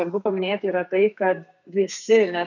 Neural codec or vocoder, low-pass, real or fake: codec, 32 kHz, 1.9 kbps, SNAC; 7.2 kHz; fake